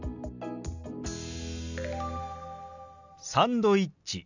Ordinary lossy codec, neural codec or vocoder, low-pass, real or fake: none; none; 7.2 kHz; real